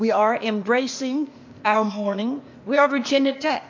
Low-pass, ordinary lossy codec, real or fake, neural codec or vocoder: 7.2 kHz; MP3, 48 kbps; fake; codec, 16 kHz, 0.8 kbps, ZipCodec